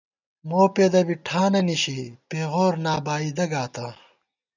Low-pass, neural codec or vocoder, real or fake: 7.2 kHz; none; real